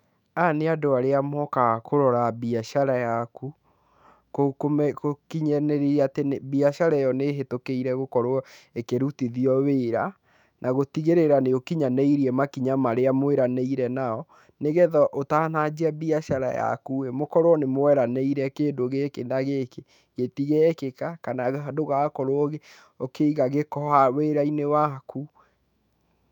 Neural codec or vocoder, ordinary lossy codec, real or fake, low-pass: autoencoder, 48 kHz, 128 numbers a frame, DAC-VAE, trained on Japanese speech; none; fake; 19.8 kHz